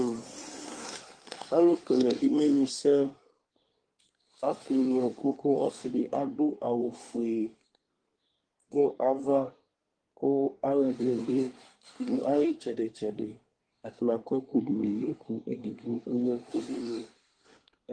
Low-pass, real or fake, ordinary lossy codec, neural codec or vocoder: 9.9 kHz; fake; Opus, 24 kbps; codec, 24 kHz, 1 kbps, SNAC